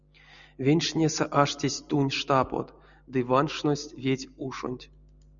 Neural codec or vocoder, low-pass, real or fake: none; 7.2 kHz; real